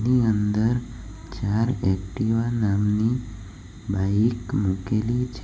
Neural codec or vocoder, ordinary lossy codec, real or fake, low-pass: none; none; real; none